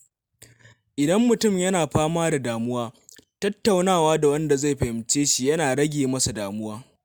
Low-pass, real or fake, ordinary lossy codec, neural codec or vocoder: none; real; none; none